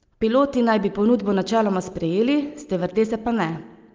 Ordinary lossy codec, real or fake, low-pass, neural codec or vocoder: Opus, 24 kbps; real; 7.2 kHz; none